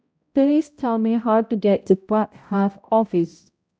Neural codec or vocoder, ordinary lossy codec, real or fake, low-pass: codec, 16 kHz, 0.5 kbps, X-Codec, HuBERT features, trained on balanced general audio; none; fake; none